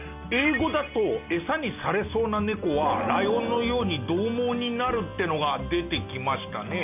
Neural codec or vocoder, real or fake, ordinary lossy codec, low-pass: none; real; none; 3.6 kHz